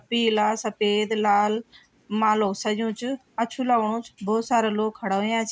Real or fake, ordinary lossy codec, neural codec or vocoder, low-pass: real; none; none; none